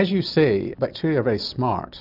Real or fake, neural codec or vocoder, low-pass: real; none; 5.4 kHz